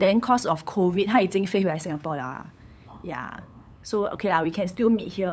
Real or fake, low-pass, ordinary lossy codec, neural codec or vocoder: fake; none; none; codec, 16 kHz, 8 kbps, FunCodec, trained on LibriTTS, 25 frames a second